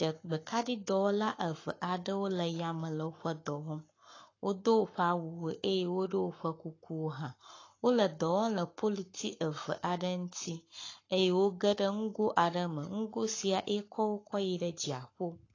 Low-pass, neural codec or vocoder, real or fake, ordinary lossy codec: 7.2 kHz; codec, 44.1 kHz, 7.8 kbps, Pupu-Codec; fake; AAC, 32 kbps